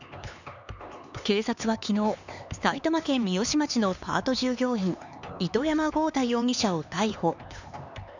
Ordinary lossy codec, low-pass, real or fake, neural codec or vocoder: none; 7.2 kHz; fake; codec, 16 kHz, 4 kbps, X-Codec, HuBERT features, trained on LibriSpeech